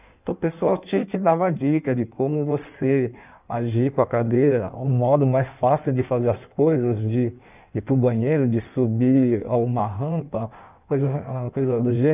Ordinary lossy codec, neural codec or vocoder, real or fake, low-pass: none; codec, 16 kHz in and 24 kHz out, 1.1 kbps, FireRedTTS-2 codec; fake; 3.6 kHz